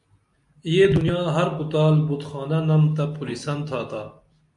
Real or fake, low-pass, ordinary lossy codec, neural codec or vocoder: real; 10.8 kHz; MP3, 64 kbps; none